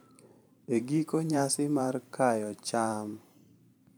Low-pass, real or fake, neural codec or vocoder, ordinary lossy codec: none; fake; vocoder, 44.1 kHz, 128 mel bands every 512 samples, BigVGAN v2; none